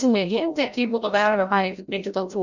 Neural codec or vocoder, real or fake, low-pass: codec, 16 kHz, 0.5 kbps, FreqCodec, larger model; fake; 7.2 kHz